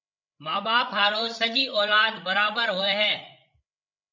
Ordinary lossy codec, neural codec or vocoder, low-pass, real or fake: MP3, 64 kbps; codec, 16 kHz, 8 kbps, FreqCodec, larger model; 7.2 kHz; fake